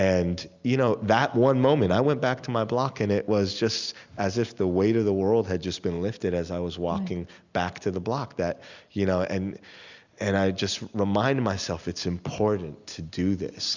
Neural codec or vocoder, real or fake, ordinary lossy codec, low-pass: none; real; Opus, 64 kbps; 7.2 kHz